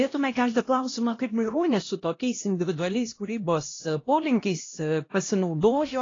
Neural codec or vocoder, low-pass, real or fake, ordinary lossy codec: codec, 16 kHz, 1 kbps, X-Codec, HuBERT features, trained on LibriSpeech; 7.2 kHz; fake; AAC, 32 kbps